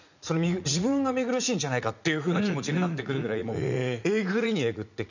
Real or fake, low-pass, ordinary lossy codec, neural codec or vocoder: fake; 7.2 kHz; none; vocoder, 44.1 kHz, 80 mel bands, Vocos